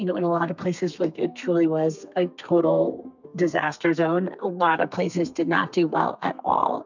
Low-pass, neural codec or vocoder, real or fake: 7.2 kHz; codec, 32 kHz, 1.9 kbps, SNAC; fake